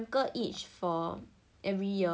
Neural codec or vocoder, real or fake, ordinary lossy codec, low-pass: none; real; none; none